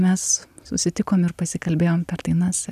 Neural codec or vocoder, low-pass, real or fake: none; 14.4 kHz; real